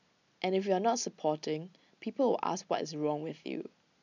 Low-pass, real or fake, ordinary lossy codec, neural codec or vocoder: 7.2 kHz; real; none; none